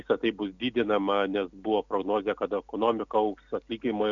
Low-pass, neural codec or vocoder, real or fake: 7.2 kHz; none; real